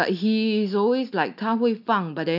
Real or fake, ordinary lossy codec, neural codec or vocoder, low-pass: real; none; none; 5.4 kHz